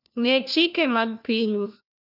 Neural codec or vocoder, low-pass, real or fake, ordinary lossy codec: codec, 16 kHz, 1 kbps, FunCodec, trained on LibriTTS, 50 frames a second; 5.4 kHz; fake; none